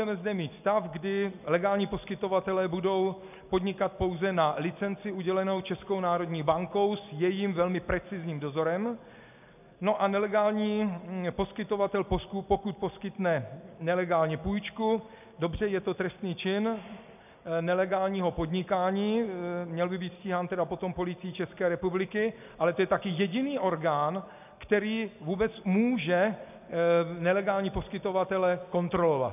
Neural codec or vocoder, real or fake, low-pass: none; real; 3.6 kHz